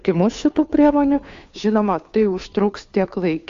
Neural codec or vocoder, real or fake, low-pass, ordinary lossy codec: codec, 16 kHz, 2 kbps, FunCodec, trained on Chinese and English, 25 frames a second; fake; 7.2 kHz; AAC, 64 kbps